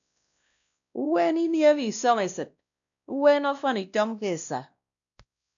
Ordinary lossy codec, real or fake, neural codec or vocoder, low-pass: MP3, 96 kbps; fake; codec, 16 kHz, 1 kbps, X-Codec, WavLM features, trained on Multilingual LibriSpeech; 7.2 kHz